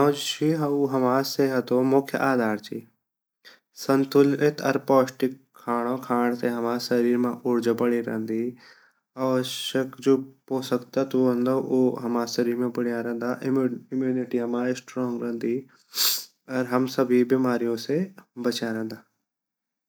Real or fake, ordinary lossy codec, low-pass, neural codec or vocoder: real; none; none; none